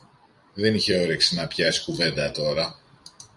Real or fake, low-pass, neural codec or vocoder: fake; 10.8 kHz; vocoder, 44.1 kHz, 128 mel bands every 256 samples, BigVGAN v2